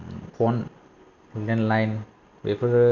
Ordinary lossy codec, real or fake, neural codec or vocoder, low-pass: none; real; none; 7.2 kHz